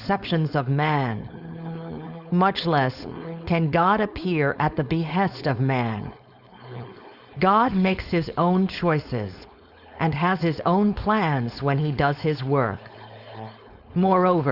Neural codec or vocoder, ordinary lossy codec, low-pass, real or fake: codec, 16 kHz, 4.8 kbps, FACodec; Opus, 64 kbps; 5.4 kHz; fake